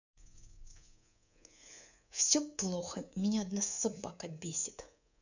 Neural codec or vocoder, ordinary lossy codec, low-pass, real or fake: codec, 24 kHz, 3.1 kbps, DualCodec; none; 7.2 kHz; fake